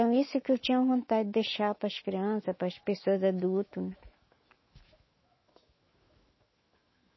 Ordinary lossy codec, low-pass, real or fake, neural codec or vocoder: MP3, 24 kbps; 7.2 kHz; fake; autoencoder, 48 kHz, 128 numbers a frame, DAC-VAE, trained on Japanese speech